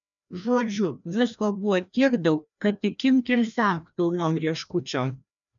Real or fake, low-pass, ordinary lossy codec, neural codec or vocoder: fake; 7.2 kHz; MP3, 96 kbps; codec, 16 kHz, 1 kbps, FreqCodec, larger model